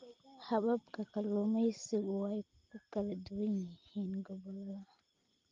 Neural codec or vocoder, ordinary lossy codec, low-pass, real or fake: none; Opus, 24 kbps; 7.2 kHz; real